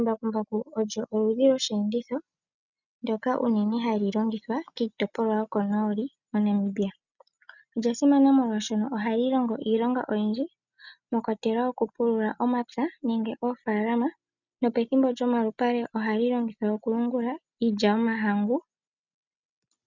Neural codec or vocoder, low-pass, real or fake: none; 7.2 kHz; real